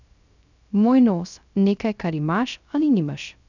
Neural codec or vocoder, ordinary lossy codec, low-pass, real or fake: codec, 16 kHz, 0.3 kbps, FocalCodec; none; 7.2 kHz; fake